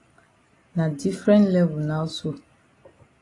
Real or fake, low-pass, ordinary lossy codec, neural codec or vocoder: real; 10.8 kHz; AAC, 32 kbps; none